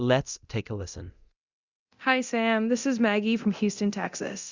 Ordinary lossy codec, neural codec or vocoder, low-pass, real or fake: Opus, 64 kbps; codec, 24 kHz, 0.9 kbps, DualCodec; 7.2 kHz; fake